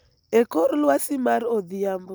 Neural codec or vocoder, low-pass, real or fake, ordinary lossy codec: none; none; real; none